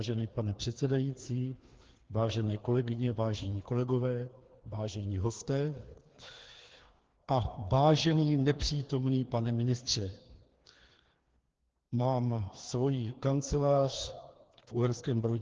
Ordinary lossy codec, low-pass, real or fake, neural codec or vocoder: Opus, 16 kbps; 7.2 kHz; fake; codec, 16 kHz, 2 kbps, FreqCodec, larger model